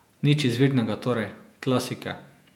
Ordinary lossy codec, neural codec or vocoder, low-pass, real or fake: MP3, 96 kbps; vocoder, 48 kHz, 128 mel bands, Vocos; 19.8 kHz; fake